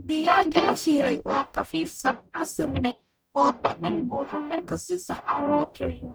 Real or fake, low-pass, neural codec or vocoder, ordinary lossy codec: fake; none; codec, 44.1 kHz, 0.9 kbps, DAC; none